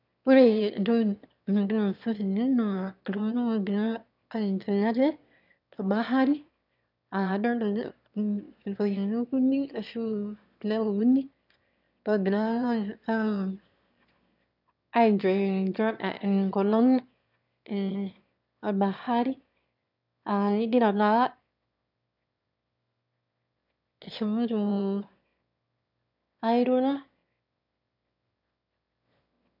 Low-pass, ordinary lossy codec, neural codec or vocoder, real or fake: 5.4 kHz; none; autoencoder, 22.05 kHz, a latent of 192 numbers a frame, VITS, trained on one speaker; fake